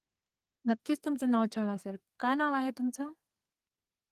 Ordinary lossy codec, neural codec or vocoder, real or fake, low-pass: Opus, 24 kbps; codec, 32 kHz, 1.9 kbps, SNAC; fake; 14.4 kHz